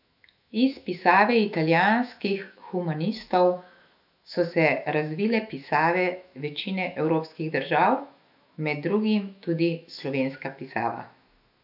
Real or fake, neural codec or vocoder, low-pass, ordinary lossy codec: fake; autoencoder, 48 kHz, 128 numbers a frame, DAC-VAE, trained on Japanese speech; 5.4 kHz; none